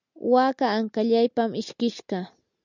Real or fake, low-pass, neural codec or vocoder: real; 7.2 kHz; none